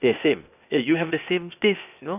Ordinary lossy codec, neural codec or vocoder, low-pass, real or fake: none; codec, 16 kHz, 0.8 kbps, ZipCodec; 3.6 kHz; fake